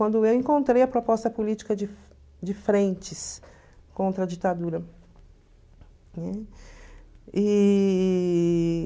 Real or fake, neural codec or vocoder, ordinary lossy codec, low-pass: real; none; none; none